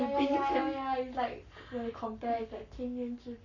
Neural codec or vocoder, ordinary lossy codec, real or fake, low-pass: codec, 44.1 kHz, 7.8 kbps, Pupu-Codec; none; fake; 7.2 kHz